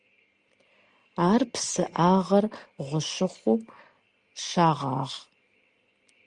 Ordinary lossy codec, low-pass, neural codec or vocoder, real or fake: Opus, 32 kbps; 9.9 kHz; none; real